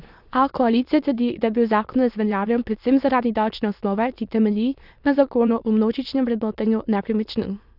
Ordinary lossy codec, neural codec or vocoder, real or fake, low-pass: none; autoencoder, 22.05 kHz, a latent of 192 numbers a frame, VITS, trained on many speakers; fake; 5.4 kHz